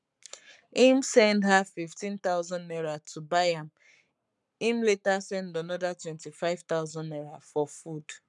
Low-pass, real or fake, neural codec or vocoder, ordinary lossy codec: 10.8 kHz; fake; codec, 44.1 kHz, 7.8 kbps, Pupu-Codec; none